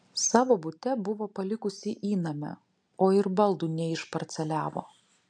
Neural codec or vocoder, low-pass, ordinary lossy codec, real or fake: none; 9.9 kHz; MP3, 64 kbps; real